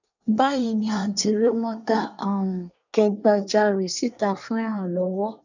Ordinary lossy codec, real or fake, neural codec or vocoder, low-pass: none; fake; codec, 24 kHz, 1 kbps, SNAC; 7.2 kHz